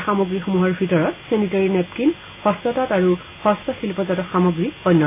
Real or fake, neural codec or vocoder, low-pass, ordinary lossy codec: real; none; 3.6 kHz; AAC, 32 kbps